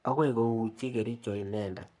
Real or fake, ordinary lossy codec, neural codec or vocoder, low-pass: fake; none; codec, 44.1 kHz, 3.4 kbps, Pupu-Codec; 10.8 kHz